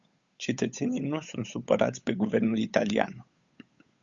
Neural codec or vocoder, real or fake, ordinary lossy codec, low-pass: codec, 16 kHz, 8 kbps, FunCodec, trained on Chinese and English, 25 frames a second; fake; Opus, 64 kbps; 7.2 kHz